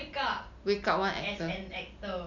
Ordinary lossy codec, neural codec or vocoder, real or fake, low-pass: none; none; real; 7.2 kHz